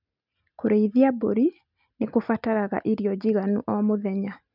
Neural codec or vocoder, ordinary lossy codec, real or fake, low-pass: none; none; real; 5.4 kHz